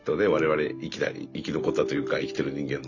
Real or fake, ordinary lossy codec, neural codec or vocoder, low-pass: real; none; none; 7.2 kHz